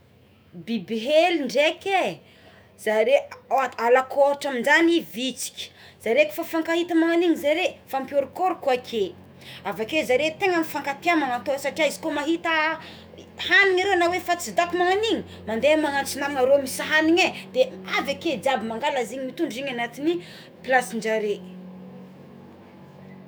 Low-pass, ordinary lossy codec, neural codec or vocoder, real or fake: none; none; autoencoder, 48 kHz, 128 numbers a frame, DAC-VAE, trained on Japanese speech; fake